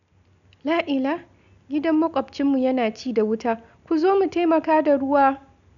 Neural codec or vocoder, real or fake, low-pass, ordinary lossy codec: none; real; 7.2 kHz; none